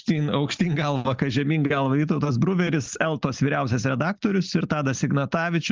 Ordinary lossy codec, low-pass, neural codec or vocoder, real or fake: Opus, 32 kbps; 7.2 kHz; none; real